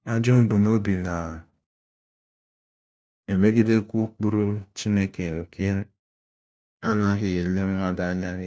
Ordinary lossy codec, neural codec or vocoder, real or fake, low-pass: none; codec, 16 kHz, 1 kbps, FunCodec, trained on LibriTTS, 50 frames a second; fake; none